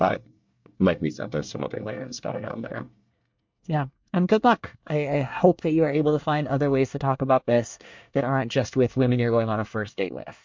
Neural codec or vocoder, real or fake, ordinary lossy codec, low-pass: codec, 24 kHz, 1 kbps, SNAC; fake; MP3, 64 kbps; 7.2 kHz